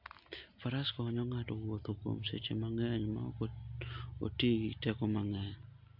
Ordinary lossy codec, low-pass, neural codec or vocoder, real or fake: MP3, 48 kbps; 5.4 kHz; none; real